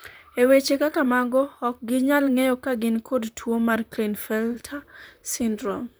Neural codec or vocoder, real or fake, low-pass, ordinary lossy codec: vocoder, 44.1 kHz, 128 mel bands, Pupu-Vocoder; fake; none; none